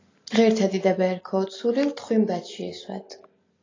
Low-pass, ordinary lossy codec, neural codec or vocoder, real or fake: 7.2 kHz; AAC, 32 kbps; none; real